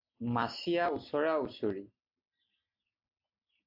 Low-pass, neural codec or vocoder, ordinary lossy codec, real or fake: 5.4 kHz; none; AAC, 48 kbps; real